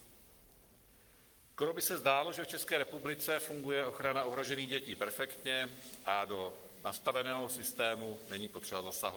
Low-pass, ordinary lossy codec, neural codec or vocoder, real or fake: 19.8 kHz; Opus, 32 kbps; codec, 44.1 kHz, 7.8 kbps, Pupu-Codec; fake